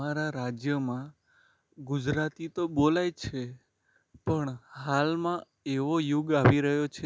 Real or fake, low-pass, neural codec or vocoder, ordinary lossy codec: real; none; none; none